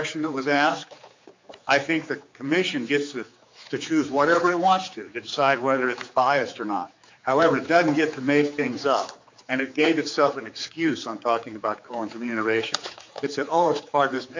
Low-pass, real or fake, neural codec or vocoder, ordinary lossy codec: 7.2 kHz; fake; codec, 16 kHz, 4 kbps, X-Codec, HuBERT features, trained on general audio; MP3, 64 kbps